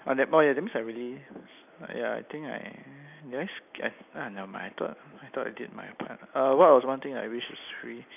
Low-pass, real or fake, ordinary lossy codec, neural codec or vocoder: 3.6 kHz; real; none; none